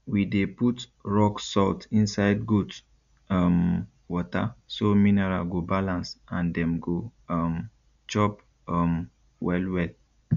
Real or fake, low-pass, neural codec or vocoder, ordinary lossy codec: real; 7.2 kHz; none; none